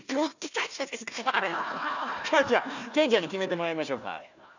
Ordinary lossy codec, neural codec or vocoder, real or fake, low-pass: none; codec, 16 kHz, 1 kbps, FunCodec, trained on Chinese and English, 50 frames a second; fake; 7.2 kHz